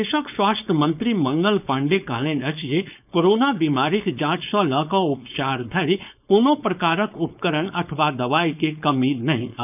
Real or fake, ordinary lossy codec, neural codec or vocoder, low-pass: fake; none; codec, 16 kHz, 4.8 kbps, FACodec; 3.6 kHz